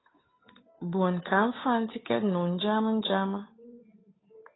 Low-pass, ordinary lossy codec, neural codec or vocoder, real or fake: 7.2 kHz; AAC, 16 kbps; codec, 16 kHz, 8 kbps, FunCodec, trained on Chinese and English, 25 frames a second; fake